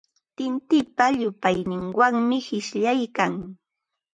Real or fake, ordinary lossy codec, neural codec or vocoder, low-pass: fake; MP3, 96 kbps; vocoder, 44.1 kHz, 128 mel bands, Pupu-Vocoder; 9.9 kHz